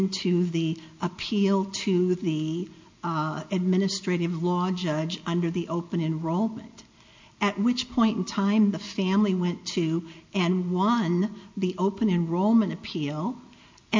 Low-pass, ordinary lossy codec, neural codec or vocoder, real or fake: 7.2 kHz; MP3, 48 kbps; none; real